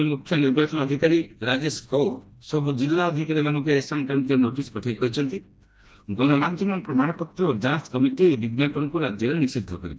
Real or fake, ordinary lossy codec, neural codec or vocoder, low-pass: fake; none; codec, 16 kHz, 1 kbps, FreqCodec, smaller model; none